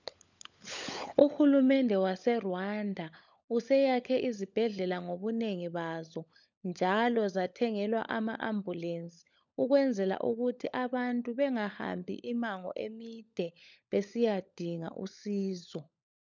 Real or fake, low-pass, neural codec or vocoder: fake; 7.2 kHz; codec, 16 kHz, 16 kbps, FunCodec, trained on LibriTTS, 50 frames a second